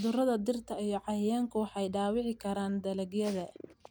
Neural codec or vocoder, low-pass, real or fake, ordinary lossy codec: none; none; real; none